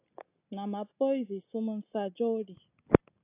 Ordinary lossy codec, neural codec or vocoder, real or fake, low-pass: AAC, 32 kbps; none; real; 3.6 kHz